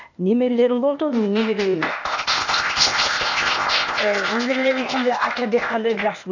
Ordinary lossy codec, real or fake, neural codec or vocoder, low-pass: none; fake; codec, 16 kHz, 0.8 kbps, ZipCodec; 7.2 kHz